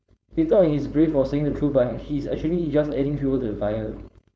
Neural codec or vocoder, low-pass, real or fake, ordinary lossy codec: codec, 16 kHz, 4.8 kbps, FACodec; none; fake; none